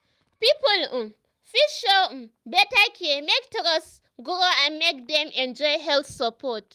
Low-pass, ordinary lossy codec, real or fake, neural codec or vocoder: 14.4 kHz; Opus, 24 kbps; fake; autoencoder, 48 kHz, 128 numbers a frame, DAC-VAE, trained on Japanese speech